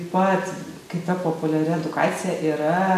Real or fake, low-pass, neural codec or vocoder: real; 14.4 kHz; none